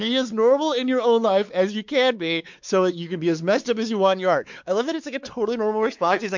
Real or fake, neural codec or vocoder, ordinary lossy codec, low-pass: fake; codec, 16 kHz, 4 kbps, X-Codec, WavLM features, trained on Multilingual LibriSpeech; MP3, 64 kbps; 7.2 kHz